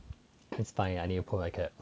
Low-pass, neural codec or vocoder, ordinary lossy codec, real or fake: none; none; none; real